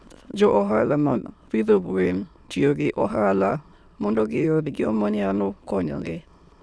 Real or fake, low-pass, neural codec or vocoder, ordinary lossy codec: fake; none; autoencoder, 22.05 kHz, a latent of 192 numbers a frame, VITS, trained on many speakers; none